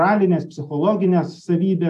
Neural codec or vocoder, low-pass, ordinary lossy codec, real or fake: none; 14.4 kHz; AAC, 96 kbps; real